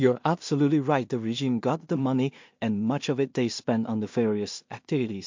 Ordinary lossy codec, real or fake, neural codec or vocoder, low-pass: MP3, 48 kbps; fake; codec, 16 kHz in and 24 kHz out, 0.4 kbps, LongCat-Audio-Codec, two codebook decoder; 7.2 kHz